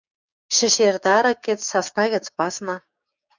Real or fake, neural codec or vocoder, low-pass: fake; codec, 16 kHz, 6 kbps, DAC; 7.2 kHz